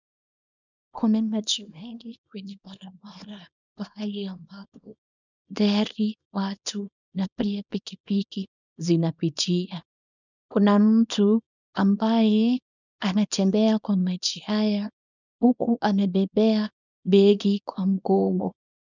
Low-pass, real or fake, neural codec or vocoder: 7.2 kHz; fake; codec, 24 kHz, 0.9 kbps, WavTokenizer, small release